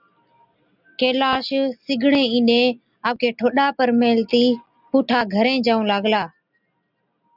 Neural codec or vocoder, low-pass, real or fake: none; 5.4 kHz; real